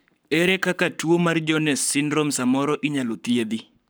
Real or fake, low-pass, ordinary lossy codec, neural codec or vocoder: fake; none; none; codec, 44.1 kHz, 7.8 kbps, Pupu-Codec